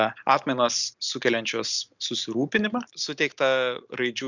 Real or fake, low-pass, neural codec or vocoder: real; 7.2 kHz; none